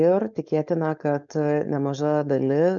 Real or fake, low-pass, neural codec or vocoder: fake; 7.2 kHz; codec, 16 kHz, 4.8 kbps, FACodec